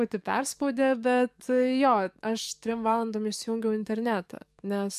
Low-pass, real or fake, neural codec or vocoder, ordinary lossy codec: 14.4 kHz; fake; codec, 44.1 kHz, 7.8 kbps, DAC; MP3, 96 kbps